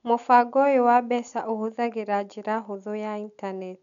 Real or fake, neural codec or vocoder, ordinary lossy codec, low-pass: real; none; none; 7.2 kHz